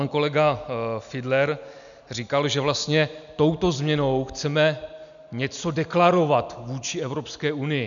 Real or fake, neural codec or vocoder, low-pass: real; none; 7.2 kHz